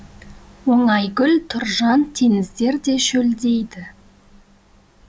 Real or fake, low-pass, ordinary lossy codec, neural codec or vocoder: real; none; none; none